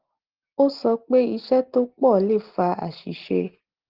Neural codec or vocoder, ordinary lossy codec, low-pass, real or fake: none; Opus, 16 kbps; 5.4 kHz; real